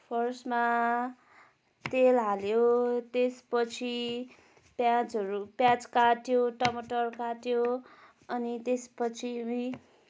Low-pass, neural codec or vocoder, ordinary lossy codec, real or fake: none; none; none; real